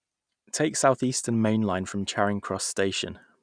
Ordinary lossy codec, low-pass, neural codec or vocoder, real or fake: none; 9.9 kHz; none; real